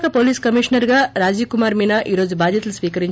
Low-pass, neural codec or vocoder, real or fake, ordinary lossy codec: none; none; real; none